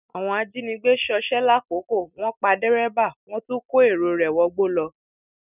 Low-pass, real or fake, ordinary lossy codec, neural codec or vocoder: 3.6 kHz; real; none; none